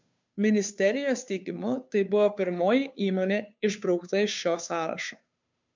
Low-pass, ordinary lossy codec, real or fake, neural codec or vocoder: 7.2 kHz; MP3, 64 kbps; fake; codec, 16 kHz, 2 kbps, FunCodec, trained on Chinese and English, 25 frames a second